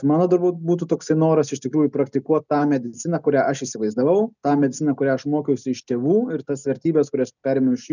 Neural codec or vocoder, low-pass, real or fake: none; 7.2 kHz; real